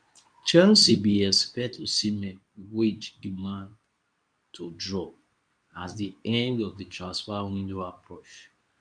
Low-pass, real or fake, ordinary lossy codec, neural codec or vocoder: 9.9 kHz; fake; none; codec, 24 kHz, 0.9 kbps, WavTokenizer, medium speech release version 2